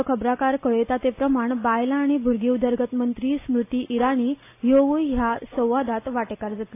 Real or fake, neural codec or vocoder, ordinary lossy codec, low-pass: real; none; AAC, 24 kbps; 3.6 kHz